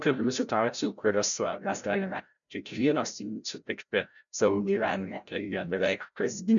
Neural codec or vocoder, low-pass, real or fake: codec, 16 kHz, 0.5 kbps, FreqCodec, larger model; 7.2 kHz; fake